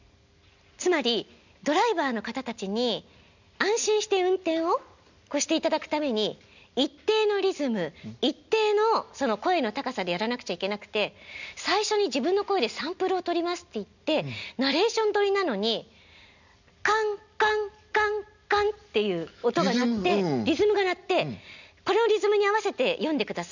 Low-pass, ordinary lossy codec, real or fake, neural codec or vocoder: 7.2 kHz; none; real; none